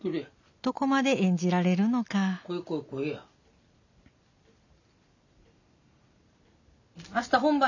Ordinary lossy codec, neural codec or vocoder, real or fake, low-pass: none; none; real; 7.2 kHz